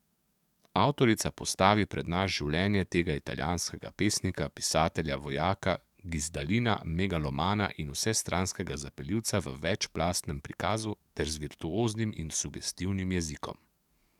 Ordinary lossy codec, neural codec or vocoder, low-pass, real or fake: none; codec, 44.1 kHz, 7.8 kbps, DAC; 19.8 kHz; fake